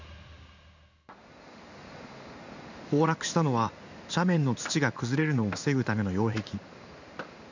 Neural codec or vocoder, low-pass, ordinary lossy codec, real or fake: codec, 16 kHz in and 24 kHz out, 1 kbps, XY-Tokenizer; 7.2 kHz; none; fake